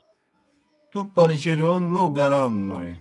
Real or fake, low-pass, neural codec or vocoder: fake; 10.8 kHz; codec, 24 kHz, 0.9 kbps, WavTokenizer, medium music audio release